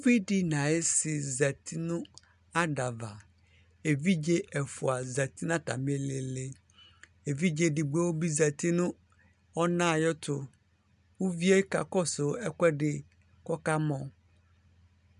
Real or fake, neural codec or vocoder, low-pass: real; none; 10.8 kHz